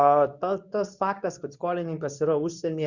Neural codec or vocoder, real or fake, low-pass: codec, 24 kHz, 0.9 kbps, WavTokenizer, medium speech release version 2; fake; 7.2 kHz